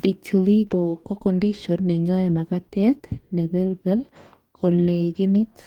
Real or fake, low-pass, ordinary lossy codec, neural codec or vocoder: fake; 19.8 kHz; Opus, 32 kbps; codec, 44.1 kHz, 2.6 kbps, DAC